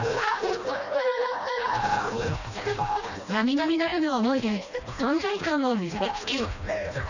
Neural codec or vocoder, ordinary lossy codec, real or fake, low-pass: codec, 16 kHz, 1 kbps, FreqCodec, smaller model; none; fake; 7.2 kHz